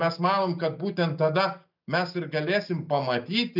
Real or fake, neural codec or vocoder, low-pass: real; none; 5.4 kHz